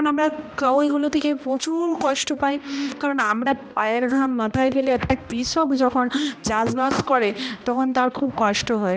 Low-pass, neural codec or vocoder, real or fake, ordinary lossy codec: none; codec, 16 kHz, 1 kbps, X-Codec, HuBERT features, trained on balanced general audio; fake; none